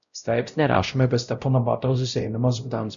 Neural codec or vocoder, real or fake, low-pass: codec, 16 kHz, 0.5 kbps, X-Codec, WavLM features, trained on Multilingual LibriSpeech; fake; 7.2 kHz